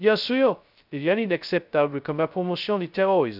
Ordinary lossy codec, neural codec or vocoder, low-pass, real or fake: none; codec, 16 kHz, 0.2 kbps, FocalCodec; 5.4 kHz; fake